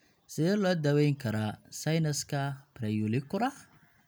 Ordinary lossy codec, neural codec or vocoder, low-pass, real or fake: none; none; none; real